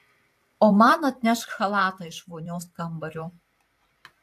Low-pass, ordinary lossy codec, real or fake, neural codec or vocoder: 14.4 kHz; AAC, 64 kbps; fake; vocoder, 44.1 kHz, 128 mel bands every 512 samples, BigVGAN v2